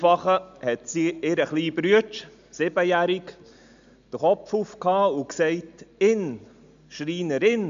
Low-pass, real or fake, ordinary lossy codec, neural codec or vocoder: 7.2 kHz; real; MP3, 64 kbps; none